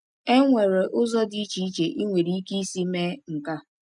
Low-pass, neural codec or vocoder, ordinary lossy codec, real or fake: 10.8 kHz; none; none; real